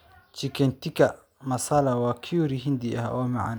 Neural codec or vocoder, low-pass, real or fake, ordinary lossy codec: none; none; real; none